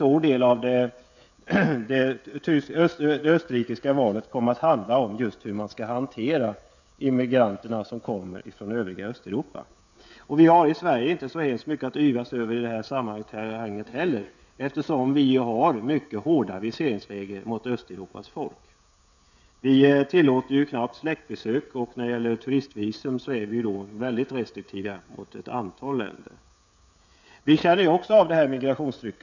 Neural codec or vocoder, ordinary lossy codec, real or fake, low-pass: codec, 16 kHz, 16 kbps, FreqCodec, smaller model; none; fake; 7.2 kHz